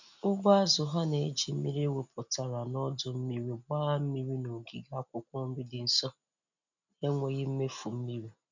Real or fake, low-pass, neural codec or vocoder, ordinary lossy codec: real; 7.2 kHz; none; none